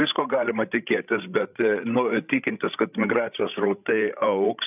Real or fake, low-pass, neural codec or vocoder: fake; 3.6 kHz; codec, 16 kHz, 16 kbps, FreqCodec, larger model